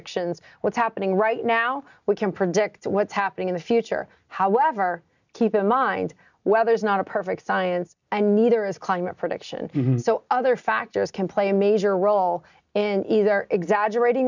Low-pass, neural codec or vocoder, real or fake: 7.2 kHz; none; real